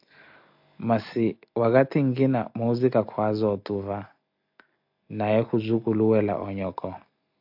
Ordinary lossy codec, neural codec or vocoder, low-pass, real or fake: AAC, 48 kbps; none; 5.4 kHz; real